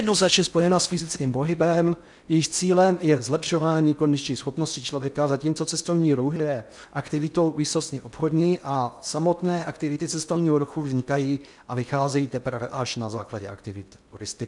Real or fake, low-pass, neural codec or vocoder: fake; 10.8 kHz; codec, 16 kHz in and 24 kHz out, 0.6 kbps, FocalCodec, streaming, 4096 codes